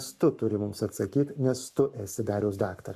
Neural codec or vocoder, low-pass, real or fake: codec, 44.1 kHz, 7.8 kbps, Pupu-Codec; 14.4 kHz; fake